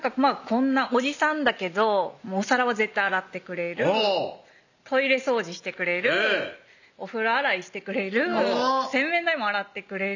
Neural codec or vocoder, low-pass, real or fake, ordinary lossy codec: none; 7.2 kHz; real; none